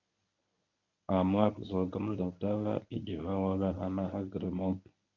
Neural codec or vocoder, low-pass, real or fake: codec, 24 kHz, 0.9 kbps, WavTokenizer, medium speech release version 1; 7.2 kHz; fake